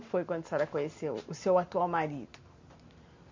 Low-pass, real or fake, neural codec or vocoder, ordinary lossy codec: 7.2 kHz; fake; vocoder, 44.1 kHz, 128 mel bands every 512 samples, BigVGAN v2; MP3, 48 kbps